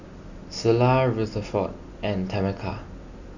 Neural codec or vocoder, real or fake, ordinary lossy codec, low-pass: none; real; none; 7.2 kHz